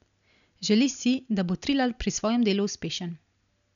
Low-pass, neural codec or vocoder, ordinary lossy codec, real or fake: 7.2 kHz; none; none; real